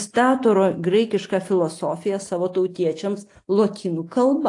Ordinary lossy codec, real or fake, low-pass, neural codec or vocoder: AAC, 48 kbps; real; 10.8 kHz; none